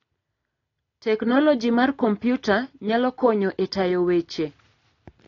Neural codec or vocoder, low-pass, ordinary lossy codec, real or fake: none; 7.2 kHz; AAC, 32 kbps; real